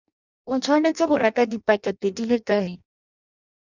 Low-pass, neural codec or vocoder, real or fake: 7.2 kHz; codec, 16 kHz in and 24 kHz out, 0.6 kbps, FireRedTTS-2 codec; fake